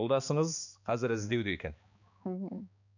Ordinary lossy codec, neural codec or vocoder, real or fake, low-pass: none; codec, 16 kHz, 2 kbps, X-Codec, HuBERT features, trained on balanced general audio; fake; 7.2 kHz